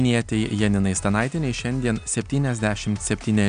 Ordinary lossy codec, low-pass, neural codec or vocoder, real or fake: MP3, 96 kbps; 9.9 kHz; none; real